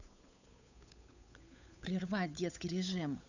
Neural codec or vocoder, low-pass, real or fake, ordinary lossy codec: codec, 16 kHz, 8 kbps, FunCodec, trained on Chinese and English, 25 frames a second; 7.2 kHz; fake; none